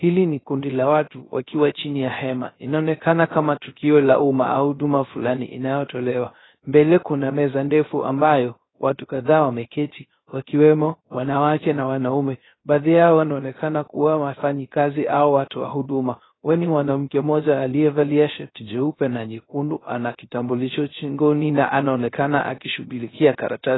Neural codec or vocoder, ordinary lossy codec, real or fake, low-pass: codec, 16 kHz, 0.3 kbps, FocalCodec; AAC, 16 kbps; fake; 7.2 kHz